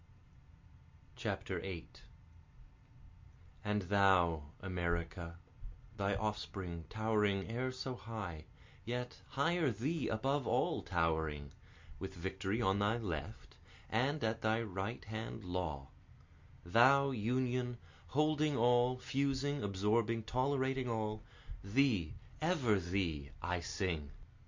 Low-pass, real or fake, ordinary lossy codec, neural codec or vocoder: 7.2 kHz; real; MP3, 64 kbps; none